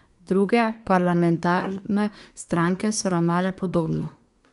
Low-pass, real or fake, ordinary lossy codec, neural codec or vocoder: 10.8 kHz; fake; none; codec, 24 kHz, 1 kbps, SNAC